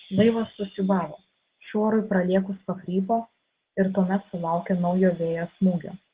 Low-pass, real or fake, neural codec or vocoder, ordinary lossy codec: 3.6 kHz; real; none; Opus, 16 kbps